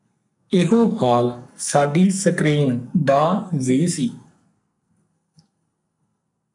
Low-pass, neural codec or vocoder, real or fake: 10.8 kHz; codec, 44.1 kHz, 2.6 kbps, SNAC; fake